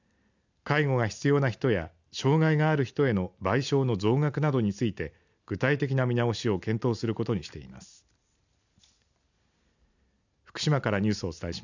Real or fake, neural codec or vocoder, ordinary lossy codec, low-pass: real; none; none; 7.2 kHz